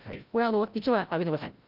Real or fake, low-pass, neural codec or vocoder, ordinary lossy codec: fake; 5.4 kHz; codec, 16 kHz, 0.5 kbps, FreqCodec, larger model; Opus, 24 kbps